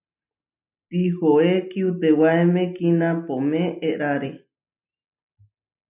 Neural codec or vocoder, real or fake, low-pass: none; real; 3.6 kHz